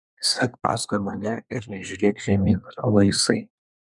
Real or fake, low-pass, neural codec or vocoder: fake; 10.8 kHz; codec, 24 kHz, 1 kbps, SNAC